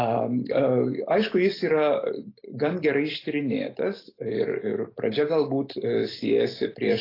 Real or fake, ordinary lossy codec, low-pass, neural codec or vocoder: real; AAC, 24 kbps; 5.4 kHz; none